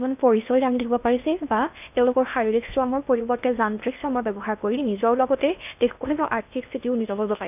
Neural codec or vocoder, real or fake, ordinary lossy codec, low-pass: codec, 16 kHz in and 24 kHz out, 0.6 kbps, FocalCodec, streaming, 4096 codes; fake; none; 3.6 kHz